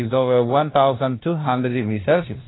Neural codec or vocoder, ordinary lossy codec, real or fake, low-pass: codec, 16 kHz, 1 kbps, FunCodec, trained on LibriTTS, 50 frames a second; AAC, 16 kbps; fake; 7.2 kHz